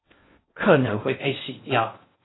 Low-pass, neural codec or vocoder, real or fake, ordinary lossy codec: 7.2 kHz; codec, 16 kHz in and 24 kHz out, 0.6 kbps, FocalCodec, streaming, 4096 codes; fake; AAC, 16 kbps